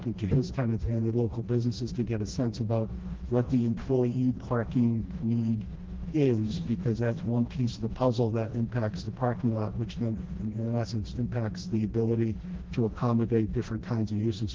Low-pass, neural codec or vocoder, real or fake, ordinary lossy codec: 7.2 kHz; codec, 16 kHz, 1 kbps, FreqCodec, smaller model; fake; Opus, 16 kbps